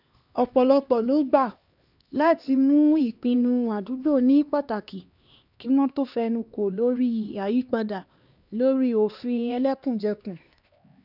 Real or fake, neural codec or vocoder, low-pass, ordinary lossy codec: fake; codec, 16 kHz, 2 kbps, X-Codec, HuBERT features, trained on LibriSpeech; 5.4 kHz; none